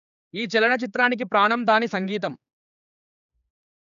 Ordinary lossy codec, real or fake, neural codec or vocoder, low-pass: none; fake; codec, 16 kHz, 4 kbps, X-Codec, HuBERT features, trained on general audio; 7.2 kHz